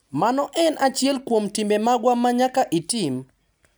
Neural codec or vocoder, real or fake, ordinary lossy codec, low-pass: none; real; none; none